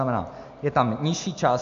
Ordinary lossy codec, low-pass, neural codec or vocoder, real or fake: MP3, 48 kbps; 7.2 kHz; none; real